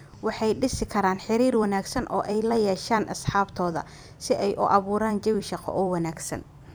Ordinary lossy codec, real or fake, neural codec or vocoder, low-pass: none; fake; vocoder, 44.1 kHz, 128 mel bands every 256 samples, BigVGAN v2; none